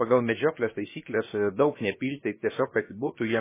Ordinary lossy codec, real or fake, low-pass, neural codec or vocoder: MP3, 16 kbps; fake; 3.6 kHz; codec, 16 kHz, about 1 kbps, DyCAST, with the encoder's durations